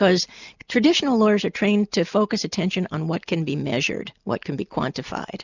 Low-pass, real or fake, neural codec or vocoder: 7.2 kHz; real; none